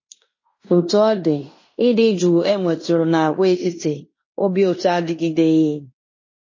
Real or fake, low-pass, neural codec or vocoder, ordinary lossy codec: fake; 7.2 kHz; codec, 16 kHz in and 24 kHz out, 0.9 kbps, LongCat-Audio-Codec, fine tuned four codebook decoder; MP3, 32 kbps